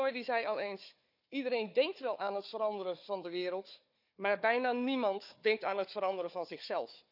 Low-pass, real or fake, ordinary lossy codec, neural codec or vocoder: 5.4 kHz; fake; none; codec, 16 kHz, 4 kbps, FunCodec, trained on Chinese and English, 50 frames a second